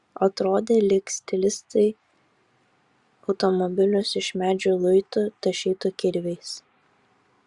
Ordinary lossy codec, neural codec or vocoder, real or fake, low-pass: Opus, 64 kbps; vocoder, 44.1 kHz, 128 mel bands every 512 samples, BigVGAN v2; fake; 10.8 kHz